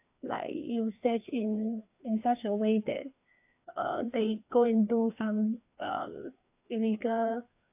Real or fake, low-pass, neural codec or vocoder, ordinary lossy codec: fake; 3.6 kHz; codec, 16 kHz, 2 kbps, FreqCodec, larger model; AAC, 32 kbps